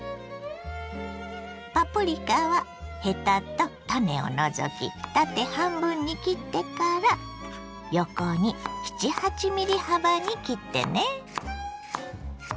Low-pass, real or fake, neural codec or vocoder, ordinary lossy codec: none; real; none; none